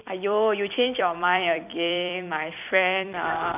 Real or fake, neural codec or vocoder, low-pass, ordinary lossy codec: fake; vocoder, 44.1 kHz, 128 mel bands every 256 samples, BigVGAN v2; 3.6 kHz; none